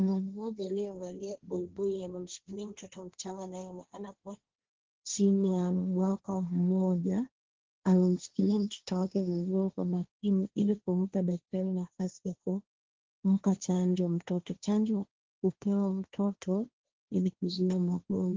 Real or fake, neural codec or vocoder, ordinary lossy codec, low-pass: fake; codec, 16 kHz, 1.1 kbps, Voila-Tokenizer; Opus, 16 kbps; 7.2 kHz